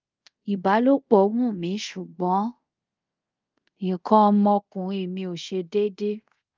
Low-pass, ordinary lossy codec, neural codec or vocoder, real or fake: 7.2 kHz; Opus, 32 kbps; codec, 24 kHz, 0.5 kbps, DualCodec; fake